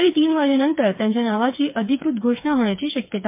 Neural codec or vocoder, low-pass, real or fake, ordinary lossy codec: codec, 16 kHz, 4 kbps, FreqCodec, smaller model; 3.6 kHz; fake; MP3, 32 kbps